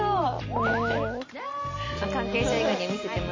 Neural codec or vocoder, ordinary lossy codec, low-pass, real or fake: none; MP3, 32 kbps; 7.2 kHz; real